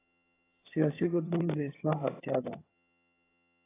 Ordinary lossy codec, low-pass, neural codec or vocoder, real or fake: AAC, 16 kbps; 3.6 kHz; vocoder, 22.05 kHz, 80 mel bands, HiFi-GAN; fake